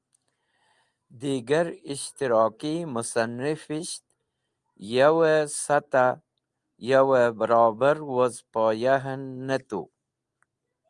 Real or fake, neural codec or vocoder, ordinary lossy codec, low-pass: real; none; Opus, 32 kbps; 10.8 kHz